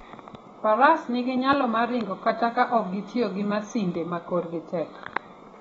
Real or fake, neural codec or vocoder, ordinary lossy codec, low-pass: fake; vocoder, 24 kHz, 100 mel bands, Vocos; AAC, 24 kbps; 10.8 kHz